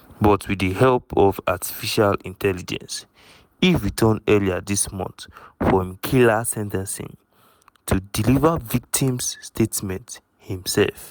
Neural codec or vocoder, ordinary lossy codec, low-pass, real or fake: none; none; none; real